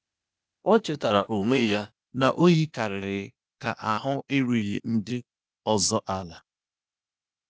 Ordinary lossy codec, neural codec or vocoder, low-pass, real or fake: none; codec, 16 kHz, 0.8 kbps, ZipCodec; none; fake